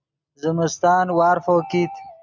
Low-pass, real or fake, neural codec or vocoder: 7.2 kHz; real; none